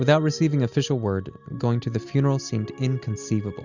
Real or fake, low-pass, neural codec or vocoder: real; 7.2 kHz; none